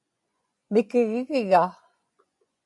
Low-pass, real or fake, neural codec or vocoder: 10.8 kHz; fake; vocoder, 24 kHz, 100 mel bands, Vocos